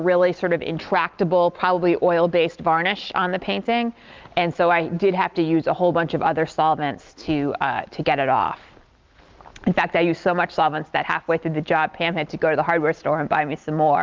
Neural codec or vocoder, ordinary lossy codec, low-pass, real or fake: none; Opus, 32 kbps; 7.2 kHz; real